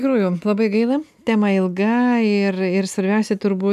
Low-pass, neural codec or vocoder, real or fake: 14.4 kHz; none; real